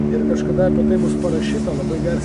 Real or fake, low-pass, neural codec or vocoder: real; 10.8 kHz; none